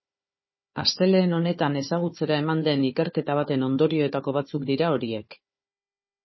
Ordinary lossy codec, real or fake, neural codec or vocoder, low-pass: MP3, 24 kbps; fake; codec, 16 kHz, 4 kbps, FunCodec, trained on Chinese and English, 50 frames a second; 7.2 kHz